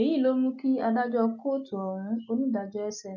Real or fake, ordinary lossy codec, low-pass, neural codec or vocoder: real; none; 7.2 kHz; none